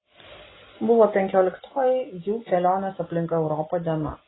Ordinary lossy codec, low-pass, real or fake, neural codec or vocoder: AAC, 16 kbps; 7.2 kHz; real; none